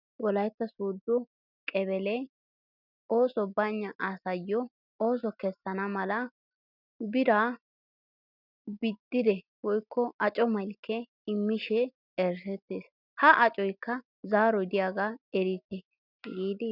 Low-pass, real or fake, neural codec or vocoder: 5.4 kHz; real; none